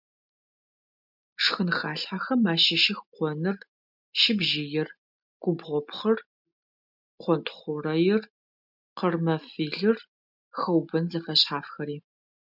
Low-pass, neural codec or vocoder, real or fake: 5.4 kHz; none; real